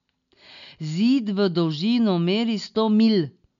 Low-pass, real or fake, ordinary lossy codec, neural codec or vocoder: 7.2 kHz; real; none; none